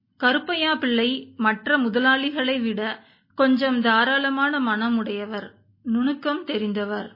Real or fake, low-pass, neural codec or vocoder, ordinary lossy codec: real; 5.4 kHz; none; MP3, 24 kbps